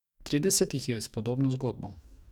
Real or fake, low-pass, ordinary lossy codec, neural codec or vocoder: fake; 19.8 kHz; none; codec, 44.1 kHz, 2.6 kbps, DAC